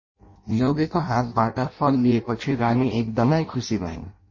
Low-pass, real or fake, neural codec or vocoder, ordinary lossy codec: 7.2 kHz; fake; codec, 16 kHz in and 24 kHz out, 0.6 kbps, FireRedTTS-2 codec; MP3, 32 kbps